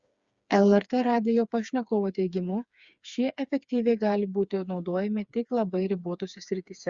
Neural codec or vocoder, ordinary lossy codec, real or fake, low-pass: codec, 16 kHz, 4 kbps, FreqCodec, smaller model; Opus, 64 kbps; fake; 7.2 kHz